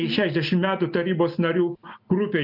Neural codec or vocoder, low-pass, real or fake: none; 5.4 kHz; real